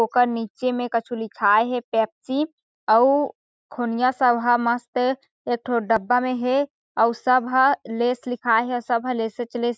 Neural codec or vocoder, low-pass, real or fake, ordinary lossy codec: none; none; real; none